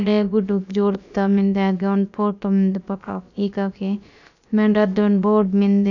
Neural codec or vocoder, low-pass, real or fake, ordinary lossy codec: codec, 16 kHz, about 1 kbps, DyCAST, with the encoder's durations; 7.2 kHz; fake; none